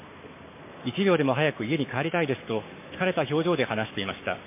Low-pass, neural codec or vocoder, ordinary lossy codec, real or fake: 3.6 kHz; codec, 44.1 kHz, 7.8 kbps, Pupu-Codec; MP3, 24 kbps; fake